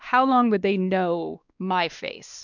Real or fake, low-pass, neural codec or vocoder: fake; 7.2 kHz; codec, 16 kHz, 2 kbps, X-Codec, WavLM features, trained on Multilingual LibriSpeech